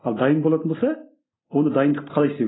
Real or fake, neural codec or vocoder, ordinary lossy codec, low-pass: real; none; AAC, 16 kbps; 7.2 kHz